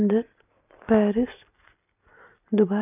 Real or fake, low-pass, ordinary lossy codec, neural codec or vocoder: real; 3.6 kHz; none; none